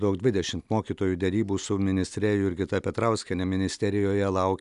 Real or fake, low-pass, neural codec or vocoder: real; 10.8 kHz; none